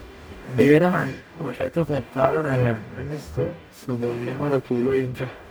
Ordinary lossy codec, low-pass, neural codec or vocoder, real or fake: none; none; codec, 44.1 kHz, 0.9 kbps, DAC; fake